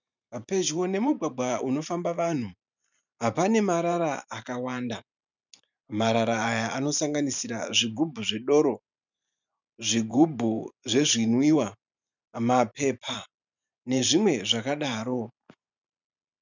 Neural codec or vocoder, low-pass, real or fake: vocoder, 44.1 kHz, 128 mel bands every 512 samples, BigVGAN v2; 7.2 kHz; fake